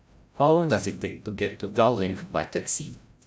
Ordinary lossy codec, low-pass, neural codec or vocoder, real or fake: none; none; codec, 16 kHz, 0.5 kbps, FreqCodec, larger model; fake